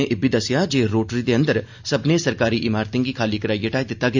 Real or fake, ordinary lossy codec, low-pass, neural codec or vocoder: real; MP3, 64 kbps; 7.2 kHz; none